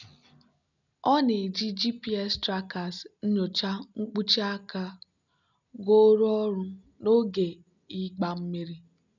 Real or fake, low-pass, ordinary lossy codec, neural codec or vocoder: real; 7.2 kHz; none; none